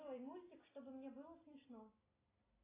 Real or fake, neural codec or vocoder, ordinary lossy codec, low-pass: real; none; AAC, 16 kbps; 3.6 kHz